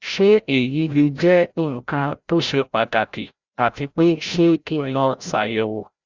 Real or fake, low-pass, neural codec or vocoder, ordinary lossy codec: fake; 7.2 kHz; codec, 16 kHz, 0.5 kbps, FreqCodec, larger model; Opus, 64 kbps